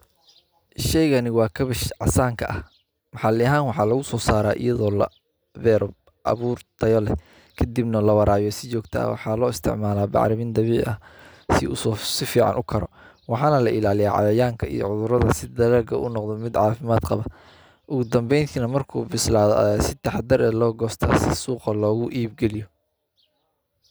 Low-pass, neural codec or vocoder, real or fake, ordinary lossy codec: none; none; real; none